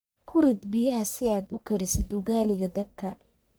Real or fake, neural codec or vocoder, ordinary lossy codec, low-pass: fake; codec, 44.1 kHz, 1.7 kbps, Pupu-Codec; none; none